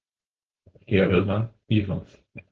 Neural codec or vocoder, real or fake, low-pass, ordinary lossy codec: codec, 16 kHz, 4.8 kbps, FACodec; fake; 7.2 kHz; Opus, 16 kbps